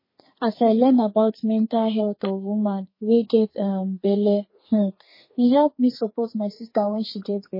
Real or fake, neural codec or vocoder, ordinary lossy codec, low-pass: fake; codec, 32 kHz, 1.9 kbps, SNAC; MP3, 24 kbps; 5.4 kHz